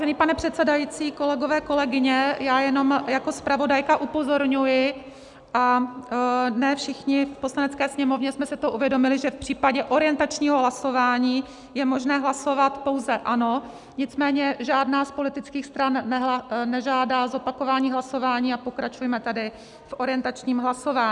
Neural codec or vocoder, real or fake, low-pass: none; real; 10.8 kHz